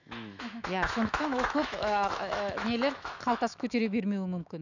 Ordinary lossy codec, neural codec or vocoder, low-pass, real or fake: none; autoencoder, 48 kHz, 128 numbers a frame, DAC-VAE, trained on Japanese speech; 7.2 kHz; fake